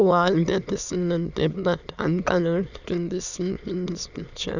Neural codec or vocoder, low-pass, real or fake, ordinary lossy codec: autoencoder, 22.05 kHz, a latent of 192 numbers a frame, VITS, trained on many speakers; 7.2 kHz; fake; none